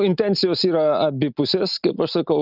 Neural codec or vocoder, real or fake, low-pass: none; real; 5.4 kHz